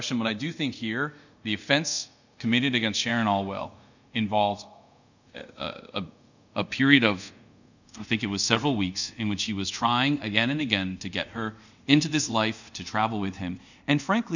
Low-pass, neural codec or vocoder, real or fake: 7.2 kHz; codec, 24 kHz, 0.5 kbps, DualCodec; fake